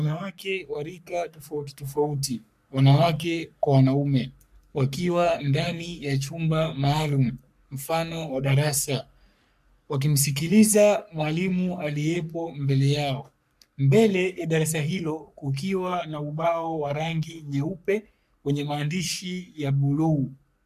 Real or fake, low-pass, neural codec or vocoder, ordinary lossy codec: fake; 14.4 kHz; codec, 44.1 kHz, 3.4 kbps, Pupu-Codec; MP3, 96 kbps